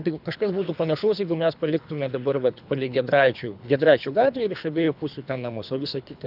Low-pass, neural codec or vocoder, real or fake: 5.4 kHz; codec, 24 kHz, 3 kbps, HILCodec; fake